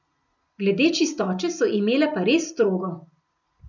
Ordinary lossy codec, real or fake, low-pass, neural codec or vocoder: none; real; 7.2 kHz; none